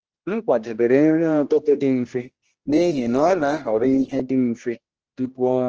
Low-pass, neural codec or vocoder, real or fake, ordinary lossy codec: 7.2 kHz; codec, 16 kHz, 1 kbps, X-Codec, HuBERT features, trained on general audio; fake; Opus, 16 kbps